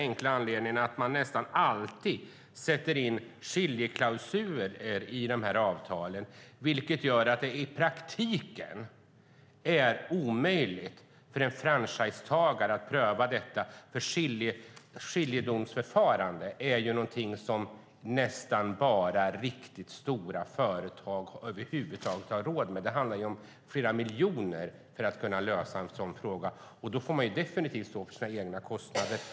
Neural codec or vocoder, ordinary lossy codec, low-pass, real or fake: none; none; none; real